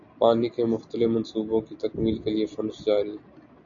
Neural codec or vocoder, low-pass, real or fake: none; 7.2 kHz; real